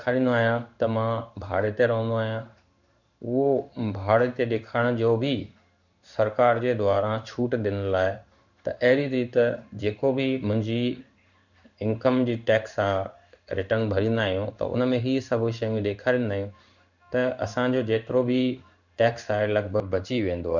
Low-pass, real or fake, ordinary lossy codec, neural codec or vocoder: 7.2 kHz; fake; none; codec, 16 kHz in and 24 kHz out, 1 kbps, XY-Tokenizer